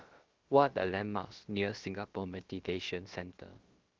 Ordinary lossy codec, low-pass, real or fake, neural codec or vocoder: Opus, 16 kbps; 7.2 kHz; fake; codec, 16 kHz, about 1 kbps, DyCAST, with the encoder's durations